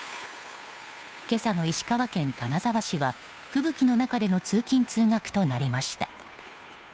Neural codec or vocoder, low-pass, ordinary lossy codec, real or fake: codec, 16 kHz, 2 kbps, FunCodec, trained on Chinese and English, 25 frames a second; none; none; fake